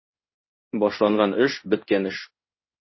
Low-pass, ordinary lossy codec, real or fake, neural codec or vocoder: 7.2 kHz; MP3, 24 kbps; fake; codec, 16 kHz in and 24 kHz out, 1 kbps, XY-Tokenizer